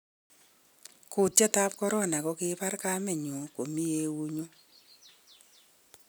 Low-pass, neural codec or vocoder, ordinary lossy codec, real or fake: none; none; none; real